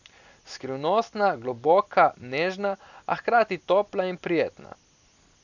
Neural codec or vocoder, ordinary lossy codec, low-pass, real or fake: none; none; 7.2 kHz; real